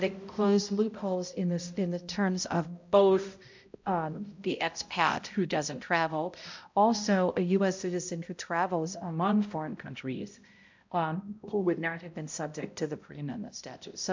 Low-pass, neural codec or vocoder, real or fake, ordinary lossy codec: 7.2 kHz; codec, 16 kHz, 0.5 kbps, X-Codec, HuBERT features, trained on balanced general audio; fake; MP3, 64 kbps